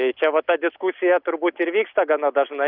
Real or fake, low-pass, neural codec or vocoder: real; 5.4 kHz; none